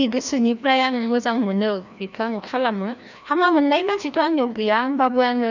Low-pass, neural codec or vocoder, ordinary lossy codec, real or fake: 7.2 kHz; codec, 16 kHz, 1 kbps, FreqCodec, larger model; none; fake